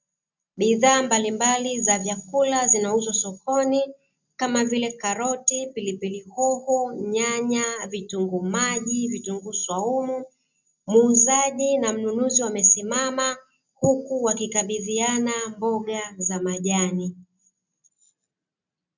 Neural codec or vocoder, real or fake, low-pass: none; real; 7.2 kHz